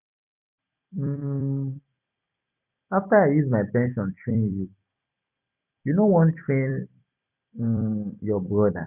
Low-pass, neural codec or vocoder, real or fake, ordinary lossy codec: 3.6 kHz; vocoder, 44.1 kHz, 128 mel bands every 256 samples, BigVGAN v2; fake; none